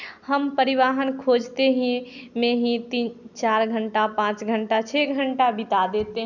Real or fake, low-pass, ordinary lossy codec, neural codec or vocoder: real; 7.2 kHz; none; none